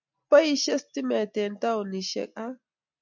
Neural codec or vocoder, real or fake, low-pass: none; real; 7.2 kHz